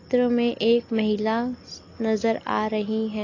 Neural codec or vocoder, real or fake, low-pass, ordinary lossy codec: none; real; 7.2 kHz; AAC, 32 kbps